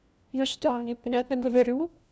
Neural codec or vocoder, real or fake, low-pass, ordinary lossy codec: codec, 16 kHz, 1 kbps, FunCodec, trained on LibriTTS, 50 frames a second; fake; none; none